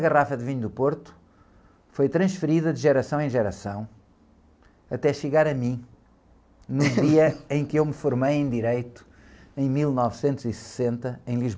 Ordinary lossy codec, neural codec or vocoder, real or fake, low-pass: none; none; real; none